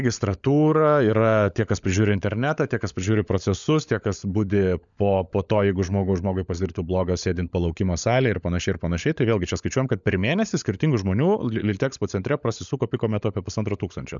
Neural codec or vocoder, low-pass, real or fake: codec, 16 kHz, 16 kbps, FunCodec, trained on LibriTTS, 50 frames a second; 7.2 kHz; fake